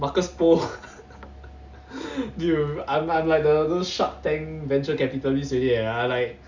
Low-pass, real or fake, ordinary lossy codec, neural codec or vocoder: 7.2 kHz; real; Opus, 64 kbps; none